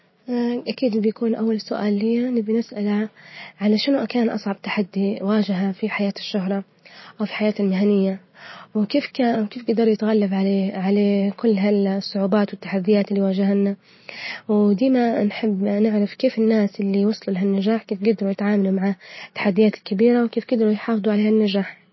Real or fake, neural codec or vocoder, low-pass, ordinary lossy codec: real; none; 7.2 kHz; MP3, 24 kbps